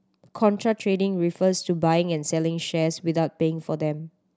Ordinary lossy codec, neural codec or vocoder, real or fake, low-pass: none; none; real; none